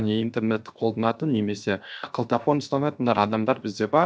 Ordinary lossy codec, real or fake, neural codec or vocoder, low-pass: none; fake; codec, 16 kHz, 0.7 kbps, FocalCodec; none